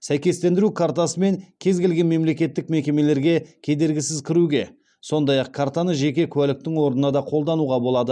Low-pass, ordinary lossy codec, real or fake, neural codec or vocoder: 9.9 kHz; none; real; none